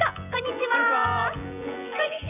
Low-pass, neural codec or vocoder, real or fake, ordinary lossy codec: 3.6 kHz; vocoder, 24 kHz, 100 mel bands, Vocos; fake; none